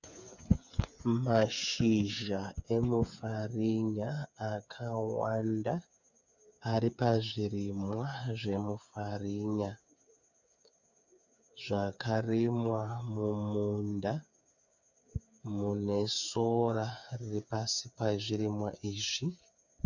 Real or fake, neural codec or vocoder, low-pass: fake; codec, 16 kHz, 8 kbps, FreqCodec, smaller model; 7.2 kHz